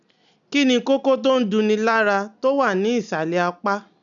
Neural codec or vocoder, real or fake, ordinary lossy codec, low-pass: none; real; none; 7.2 kHz